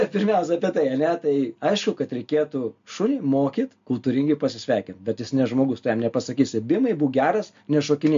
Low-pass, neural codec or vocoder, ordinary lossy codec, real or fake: 7.2 kHz; none; MP3, 48 kbps; real